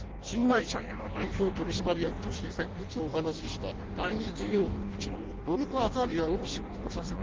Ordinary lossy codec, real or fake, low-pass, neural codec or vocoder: Opus, 16 kbps; fake; 7.2 kHz; codec, 16 kHz in and 24 kHz out, 0.6 kbps, FireRedTTS-2 codec